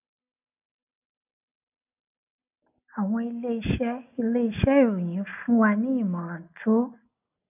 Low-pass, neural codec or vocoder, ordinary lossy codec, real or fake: 3.6 kHz; none; none; real